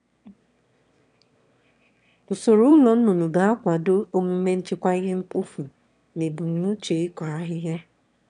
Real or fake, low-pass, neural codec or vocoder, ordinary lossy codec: fake; 9.9 kHz; autoencoder, 22.05 kHz, a latent of 192 numbers a frame, VITS, trained on one speaker; none